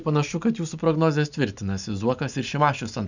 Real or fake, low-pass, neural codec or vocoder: real; 7.2 kHz; none